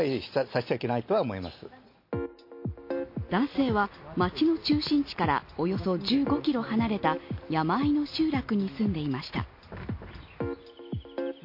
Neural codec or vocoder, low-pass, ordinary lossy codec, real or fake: none; 5.4 kHz; MP3, 32 kbps; real